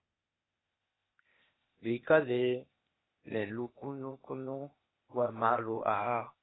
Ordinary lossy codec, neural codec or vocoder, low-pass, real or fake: AAC, 16 kbps; codec, 16 kHz, 0.8 kbps, ZipCodec; 7.2 kHz; fake